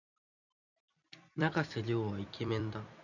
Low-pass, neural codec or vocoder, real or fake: 7.2 kHz; vocoder, 44.1 kHz, 128 mel bands every 256 samples, BigVGAN v2; fake